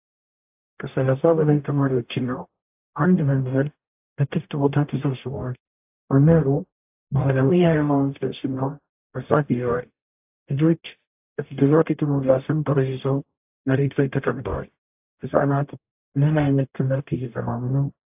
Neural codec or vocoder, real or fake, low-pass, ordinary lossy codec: codec, 44.1 kHz, 0.9 kbps, DAC; fake; 3.6 kHz; AAC, 32 kbps